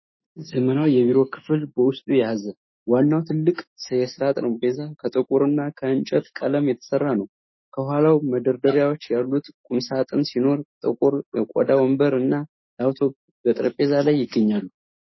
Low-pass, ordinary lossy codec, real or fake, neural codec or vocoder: 7.2 kHz; MP3, 24 kbps; fake; autoencoder, 48 kHz, 128 numbers a frame, DAC-VAE, trained on Japanese speech